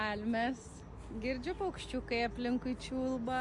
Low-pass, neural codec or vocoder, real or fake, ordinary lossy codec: 10.8 kHz; none; real; MP3, 48 kbps